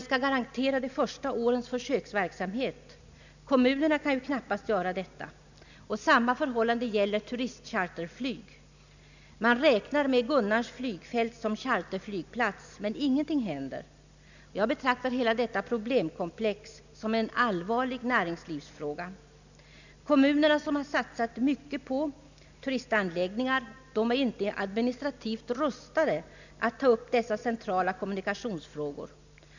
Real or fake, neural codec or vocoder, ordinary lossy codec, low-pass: real; none; none; 7.2 kHz